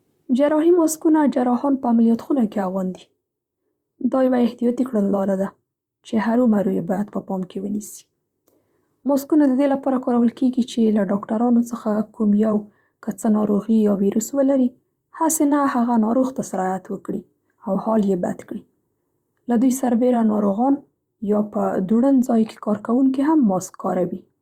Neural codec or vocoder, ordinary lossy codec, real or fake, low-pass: vocoder, 44.1 kHz, 128 mel bands, Pupu-Vocoder; Opus, 64 kbps; fake; 19.8 kHz